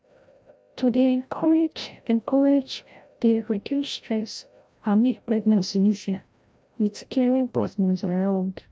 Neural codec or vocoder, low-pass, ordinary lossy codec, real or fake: codec, 16 kHz, 0.5 kbps, FreqCodec, larger model; none; none; fake